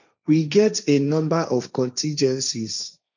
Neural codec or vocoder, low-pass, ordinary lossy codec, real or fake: codec, 16 kHz, 1.1 kbps, Voila-Tokenizer; 7.2 kHz; none; fake